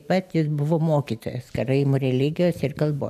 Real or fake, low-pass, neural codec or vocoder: real; 14.4 kHz; none